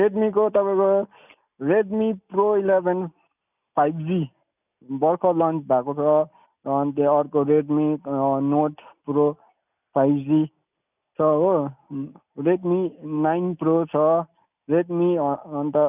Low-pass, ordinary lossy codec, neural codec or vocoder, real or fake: 3.6 kHz; none; none; real